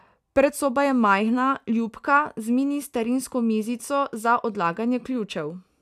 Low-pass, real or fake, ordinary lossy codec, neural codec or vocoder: 14.4 kHz; real; none; none